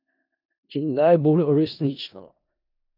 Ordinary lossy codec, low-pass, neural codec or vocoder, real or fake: none; 5.4 kHz; codec, 16 kHz in and 24 kHz out, 0.4 kbps, LongCat-Audio-Codec, four codebook decoder; fake